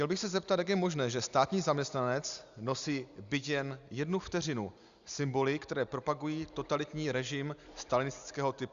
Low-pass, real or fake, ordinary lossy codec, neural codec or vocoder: 7.2 kHz; real; Opus, 64 kbps; none